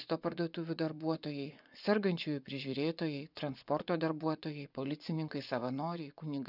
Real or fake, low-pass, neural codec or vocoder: fake; 5.4 kHz; vocoder, 24 kHz, 100 mel bands, Vocos